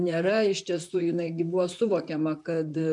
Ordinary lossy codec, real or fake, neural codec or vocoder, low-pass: MP3, 64 kbps; fake; vocoder, 44.1 kHz, 128 mel bands, Pupu-Vocoder; 10.8 kHz